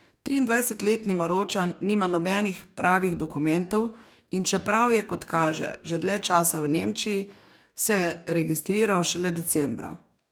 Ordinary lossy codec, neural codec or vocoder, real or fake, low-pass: none; codec, 44.1 kHz, 2.6 kbps, DAC; fake; none